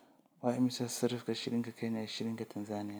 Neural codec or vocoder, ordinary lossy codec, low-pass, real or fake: none; none; none; real